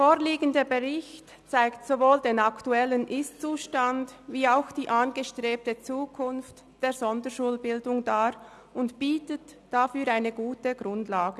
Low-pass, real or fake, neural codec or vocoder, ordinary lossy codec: none; real; none; none